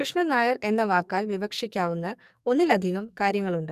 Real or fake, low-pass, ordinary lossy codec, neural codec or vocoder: fake; 14.4 kHz; none; codec, 44.1 kHz, 2.6 kbps, SNAC